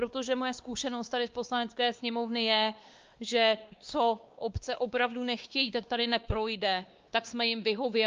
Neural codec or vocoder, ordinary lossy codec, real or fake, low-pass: codec, 16 kHz, 2 kbps, X-Codec, WavLM features, trained on Multilingual LibriSpeech; Opus, 24 kbps; fake; 7.2 kHz